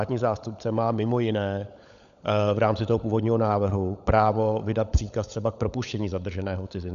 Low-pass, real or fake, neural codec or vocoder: 7.2 kHz; fake; codec, 16 kHz, 16 kbps, FunCodec, trained on LibriTTS, 50 frames a second